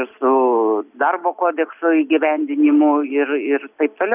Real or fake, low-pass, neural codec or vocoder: real; 3.6 kHz; none